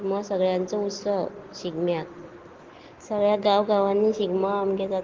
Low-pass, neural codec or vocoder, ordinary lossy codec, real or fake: 7.2 kHz; none; Opus, 16 kbps; real